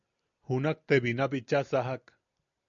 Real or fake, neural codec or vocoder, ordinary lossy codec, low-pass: real; none; MP3, 96 kbps; 7.2 kHz